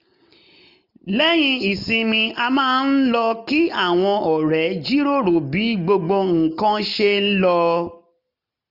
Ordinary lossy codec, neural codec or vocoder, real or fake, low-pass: none; none; real; 5.4 kHz